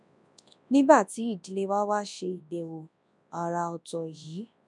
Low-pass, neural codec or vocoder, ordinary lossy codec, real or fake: 10.8 kHz; codec, 24 kHz, 0.9 kbps, WavTokenizer, large speech release; none; fake